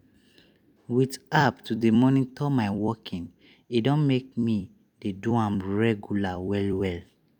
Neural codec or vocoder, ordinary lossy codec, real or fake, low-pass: vocoder, 44.1 kHz, 128 mel bands every 256 samples, BigVGAN v2; none; fake; 19.8 kHz